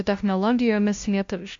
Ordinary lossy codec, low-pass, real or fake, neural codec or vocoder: MP3, 48 kbps; 7.2 kHz; fake; codec, 16 kHz, 0.5 kbps, FunCodec, trained on LibriTTS, 25 frames a second